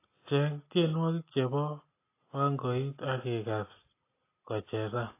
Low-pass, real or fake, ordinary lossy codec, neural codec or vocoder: 3.6 kHz; real; AAC, 24 kbps; none